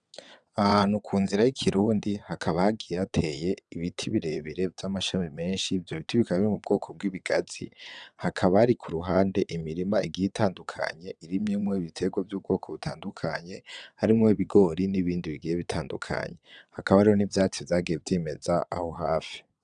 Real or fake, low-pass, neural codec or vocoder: fake; 9.9 kHz; vocoder, 22.05 kHz, 80 mel bands, WaveNeXt